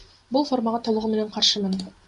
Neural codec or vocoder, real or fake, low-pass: none; real; 10.8 kHz